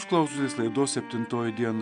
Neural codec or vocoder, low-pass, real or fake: none; 9.9 kHz; real